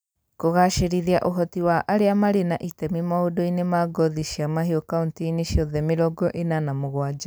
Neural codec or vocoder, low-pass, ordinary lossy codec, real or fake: none; none; none; real